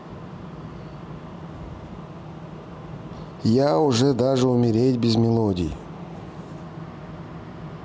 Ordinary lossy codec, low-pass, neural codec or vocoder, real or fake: none; none; none; real